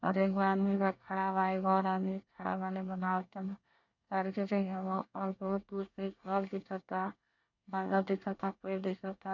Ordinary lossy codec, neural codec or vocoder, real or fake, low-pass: none; codec, 24 kHz, 1 kbps, SNAC; fake; 7.2 kHz